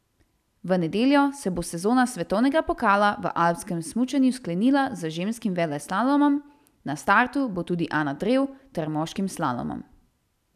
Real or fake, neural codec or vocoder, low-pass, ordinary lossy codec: real; none; 14.4 kHz; none